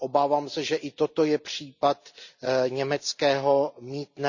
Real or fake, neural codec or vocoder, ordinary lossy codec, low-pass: real; none; none; 7.2 kHz